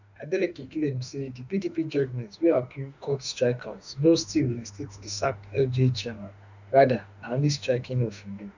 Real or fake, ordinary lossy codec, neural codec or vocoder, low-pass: fake; none; autoencoder, 48 kHz, 32 numbers a frame, DAC-VAE, trained on Japanese speech; 7.2 kHz